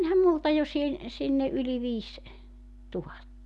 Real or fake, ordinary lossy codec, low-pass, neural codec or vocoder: real; none; none; none